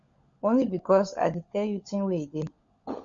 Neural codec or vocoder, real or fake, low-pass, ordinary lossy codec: codec, 16 kHz, 16 kbps, FunCodec, trained on LibriTTS, 50 frames a second; fake; 7.2 kHz; Opus, 64 kbps